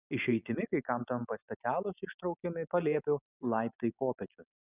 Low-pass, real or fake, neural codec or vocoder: 3.6 kHz; real; none